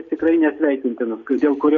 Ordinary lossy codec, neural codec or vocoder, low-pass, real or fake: MP3, 48 kbps; none; 7.2 kHz; real